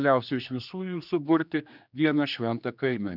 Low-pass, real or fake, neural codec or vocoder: 5.4 kHz; fake; codec, 16 kHz, 2 kbps, X-Codec, HuBERT features, trained on general audio